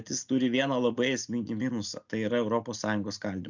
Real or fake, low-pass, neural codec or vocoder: real; 7.2 kHz; none